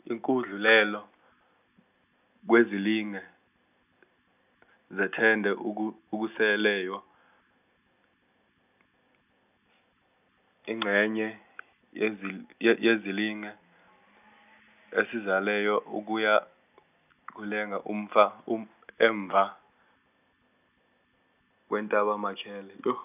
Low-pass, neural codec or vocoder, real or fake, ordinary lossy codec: 3.6 kHz; none; real; none